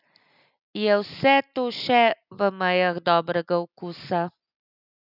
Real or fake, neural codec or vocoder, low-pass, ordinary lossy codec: real; none; 5.4 kHz; none